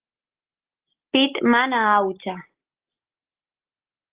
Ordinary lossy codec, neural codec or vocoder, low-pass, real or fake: Opus, 16 kbps; none; 3.6 kHz; real